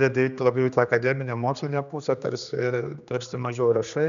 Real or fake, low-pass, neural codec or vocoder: fake; 7.2 kHz; codec, 16 kHz, 2 kbps, X-Codec, HuBERT features, trained on general audio